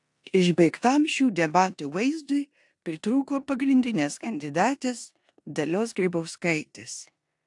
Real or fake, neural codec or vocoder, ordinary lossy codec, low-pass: fake; codec, 16 kHz in and 24 kHz out, 0.9 kbps, LongCat-Audio-Codec, four codebook decoder; AAC, 64 kbps; 10.8 kHz